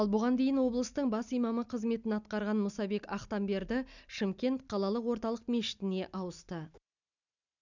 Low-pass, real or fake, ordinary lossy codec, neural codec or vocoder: 7.2 kHz; real; none; none